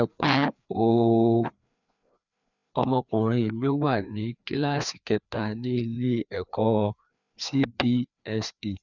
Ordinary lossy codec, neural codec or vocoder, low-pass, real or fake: none; codec, 16 kHz, 2 kbps, FreqCodec, larger model; 7.2 kHz; fake